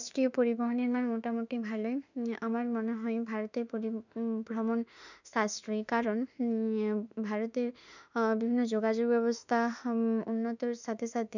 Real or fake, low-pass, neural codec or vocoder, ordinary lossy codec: fake; 7.2 kHz; autoencoder, 48 kHz, 32 numbers a frame, DAC-VAE, trained on Japanese speech; none